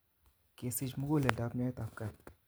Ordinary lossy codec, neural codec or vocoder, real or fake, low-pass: none; none; real; none